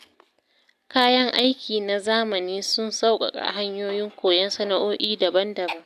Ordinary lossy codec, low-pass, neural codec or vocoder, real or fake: none; 14.4 kHz; none; real